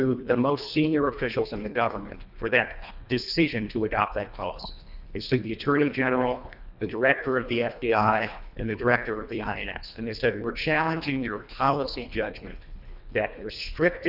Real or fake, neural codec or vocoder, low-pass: fake; codec, 24 kHz, 1.5 kbps, HILCodec; 5.4 kHz